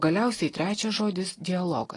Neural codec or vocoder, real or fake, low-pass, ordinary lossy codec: none; real; 10.8 kHz; AAC, 32 kbps